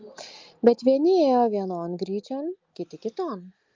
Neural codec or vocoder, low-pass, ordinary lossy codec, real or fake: none; 7.2 kHz; Opus, 24 kbps; real